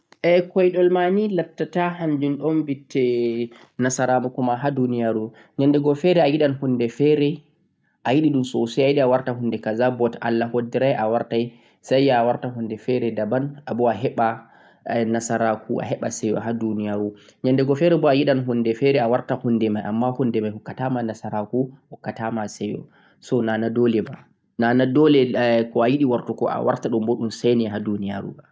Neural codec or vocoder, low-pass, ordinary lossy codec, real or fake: none; none; none; real